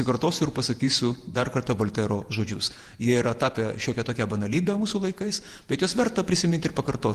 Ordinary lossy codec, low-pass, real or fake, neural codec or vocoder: Opus, 24 kbps; 14.4 kHz; fake; vocoder, 48 kHz, 128 mel bands, Vocos